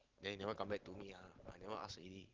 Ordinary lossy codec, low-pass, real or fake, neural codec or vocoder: Opus, 16 kbps; 7.2 kHz; real; none